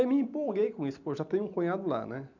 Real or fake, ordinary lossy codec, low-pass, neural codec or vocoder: real; none; 7.2 kHz; none